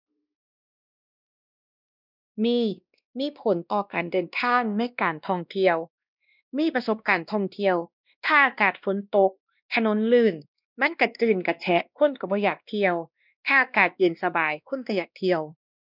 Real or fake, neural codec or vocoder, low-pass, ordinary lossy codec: fake; codec, 16 kHz, 1 kbps, X-Codec, WavLM features, trained on Multilingual LibriSpeech; 5.4 kHz; none